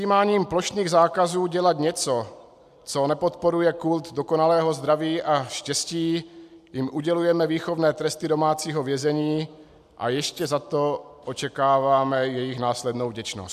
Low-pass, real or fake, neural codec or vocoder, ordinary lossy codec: 14.4 kHz; real; none; AAC, 96 kbps